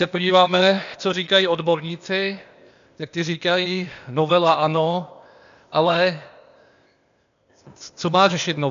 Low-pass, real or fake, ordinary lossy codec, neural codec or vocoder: 7.2 kHz; fake; MP3, 64 kbps; codec, 16 kHz, 0.8 kbps, ZipCodec